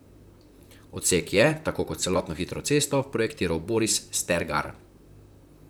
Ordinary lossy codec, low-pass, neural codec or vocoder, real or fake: none; none; vocoder, 44.1 kHz, 128 mel bands, Pupu-Vocoder; fake